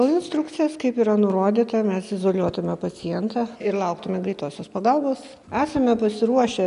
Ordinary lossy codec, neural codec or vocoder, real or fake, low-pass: AAC, 96 kbps; none; real; 10.8 kHz